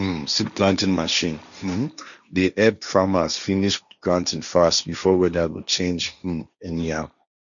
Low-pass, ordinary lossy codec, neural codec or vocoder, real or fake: 7.2 kHz; none; codec, 16 kHz, 1.1 kbps, Voila-Tokenizer; fake